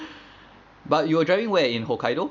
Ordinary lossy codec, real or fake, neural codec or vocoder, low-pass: none; real; none; 7.2 kHz